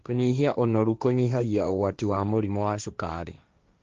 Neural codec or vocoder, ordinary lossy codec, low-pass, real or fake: codec, 16 kHz, 1.1 kbps, Voila-Tokenizer; Opus, 32 kbps; 7.2 kHz; fake